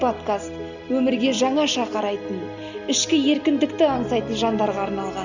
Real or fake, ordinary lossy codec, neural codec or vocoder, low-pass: real; none; none; 7.2 kHz